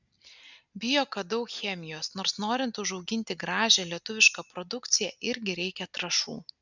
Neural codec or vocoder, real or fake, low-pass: none; real; 7.2 kHz